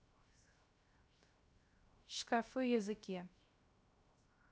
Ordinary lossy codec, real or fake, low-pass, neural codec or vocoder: none; fake; none; codec, 16 kHz, 0.3 kbps, FocalCodec